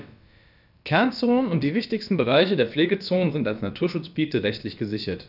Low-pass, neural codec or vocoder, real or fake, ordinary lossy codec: 5.4 kHz; codec, 16 kHz, about 1 kbps, DyCAST, with the encoder's durations; fake; none